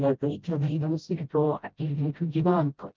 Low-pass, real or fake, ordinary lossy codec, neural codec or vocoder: 7.2 kHz; fake; Opus, 24 kbps; codec, 16 kHz, 0.5 kbps, FreqCodec, smaller model